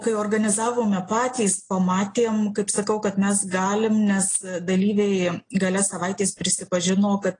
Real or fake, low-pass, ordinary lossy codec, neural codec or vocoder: real; 9.9 kHz; AAC, 32 kbps; none